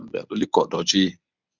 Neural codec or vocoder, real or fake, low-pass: codec, 24 kHz, 0.9 kbps, WavTokenizer, medium speech release version 1; fake; 7.2 kHz